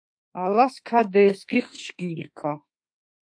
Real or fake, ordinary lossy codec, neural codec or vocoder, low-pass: fake; AAC, 64 kbps; autoencoder, 48 kHz, 32 numbers a frame, DAC-VAE, trained on Japanese speech; 9.9 kHz